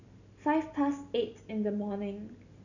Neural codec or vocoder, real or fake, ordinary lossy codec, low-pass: none; real; none; 7.2 kHz